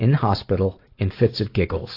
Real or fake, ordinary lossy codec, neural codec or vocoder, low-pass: real; AAC, 32 kbps; none; 5.4 kHz